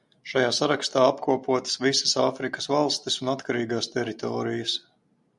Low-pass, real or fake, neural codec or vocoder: 10.8 kHz; real; none